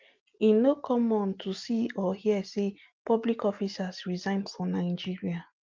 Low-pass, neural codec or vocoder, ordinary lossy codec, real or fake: 7.2 kHz; none; Opus, 32 kbps; real